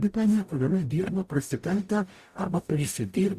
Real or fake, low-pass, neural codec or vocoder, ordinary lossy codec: fake; 14.4 kHz; codec, 44.1 kHz, 0.9 kbps, DAC; Opus, 64 kbps